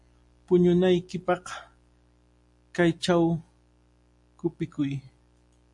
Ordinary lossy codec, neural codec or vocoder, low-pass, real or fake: MP3, 48 kbps; none; 10.8 kHz; real